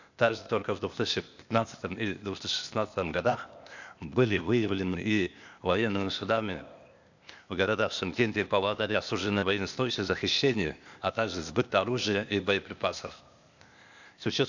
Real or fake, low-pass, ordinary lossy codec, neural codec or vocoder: fake; 7.2 kHz; none; codec, 16 kHz, 0.8 kbps, ZipCodec